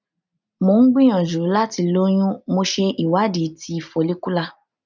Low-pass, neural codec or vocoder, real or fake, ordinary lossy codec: 7.2 kHz; none; real; none